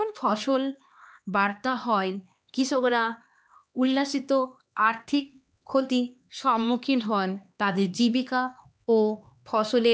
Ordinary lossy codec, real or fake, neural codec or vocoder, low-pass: none; fake; codec, 16 kHz, 2 kbps, X-Codec, HuBERT features, trained on LibriSpeech; none